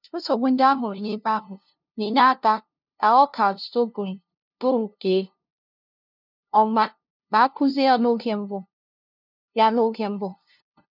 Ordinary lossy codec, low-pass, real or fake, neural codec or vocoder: none; 5.4 kHz; fake; codec, 16 kHz, 0.5 kbps, FunCodec, trained on LibriTTS, 25 frames a second